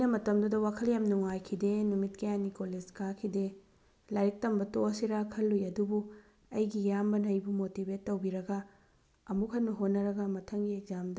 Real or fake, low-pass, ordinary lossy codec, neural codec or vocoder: real; none; none; none